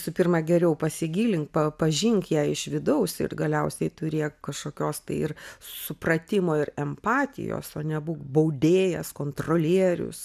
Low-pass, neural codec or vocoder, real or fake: 14.4 kHz; none; real